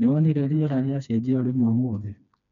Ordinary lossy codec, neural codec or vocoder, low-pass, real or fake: none; codec, 16 kHz, 2 kbps, FreqCodec, smaller model; 7.2 kHz; fake